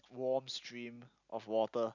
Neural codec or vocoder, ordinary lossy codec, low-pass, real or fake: none; none; 7.2 kHz; real